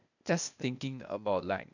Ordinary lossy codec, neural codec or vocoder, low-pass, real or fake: none; codec, 16 kHz, 0.8 kbps, ZipCodec; 7.2 kHz; fake